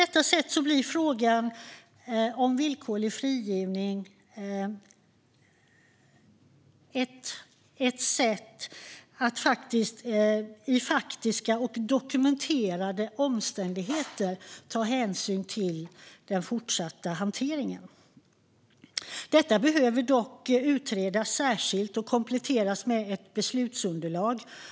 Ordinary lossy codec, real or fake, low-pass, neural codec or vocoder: none; real; none; none